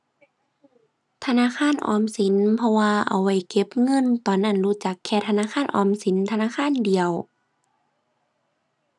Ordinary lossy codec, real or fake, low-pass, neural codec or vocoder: none; real; none; none